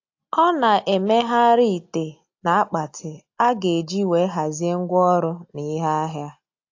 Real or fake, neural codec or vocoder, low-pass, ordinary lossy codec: real; none; 7.2 kHz; AAC, 48 kbps